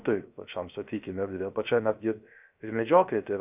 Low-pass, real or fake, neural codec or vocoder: 3.6 kHz; fake; codec, 16 kHz, 0.3 kbps, FocalCodec